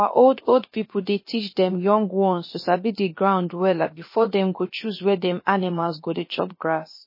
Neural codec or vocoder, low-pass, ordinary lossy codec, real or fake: codec, 16 kHz, about 1 kbps, DyCAST, with the encoder's durations; 5.4 kHz; MP3, 24 kbps; fake